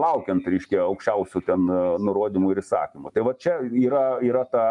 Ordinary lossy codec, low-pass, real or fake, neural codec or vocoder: MP3, 96 kbps; 10.8 kHz; real; none